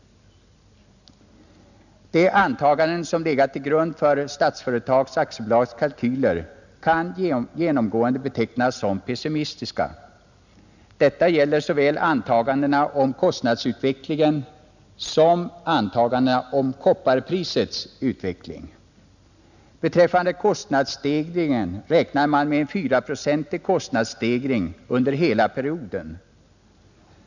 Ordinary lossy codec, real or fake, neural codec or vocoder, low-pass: none; real; none; 7.2 kHz